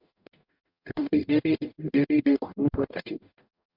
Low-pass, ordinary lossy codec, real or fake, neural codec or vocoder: 5.4 kHz; MP3, 48 kbps; fake; codec, 44.1 kHz, 0.9 kbps, DAC